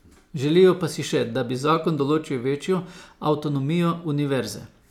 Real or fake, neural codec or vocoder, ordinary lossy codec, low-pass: real; none; none; 19.8 kHz